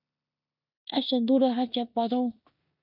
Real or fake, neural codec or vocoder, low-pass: fake; codec, 16 kHz in and 24 kHz out, 0.9 kbps, LongCat-Audio-Codec, four codebook decoder; 5.4 kHz